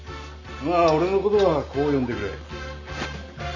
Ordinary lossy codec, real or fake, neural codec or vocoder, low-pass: none; real; none; 7.2 kHz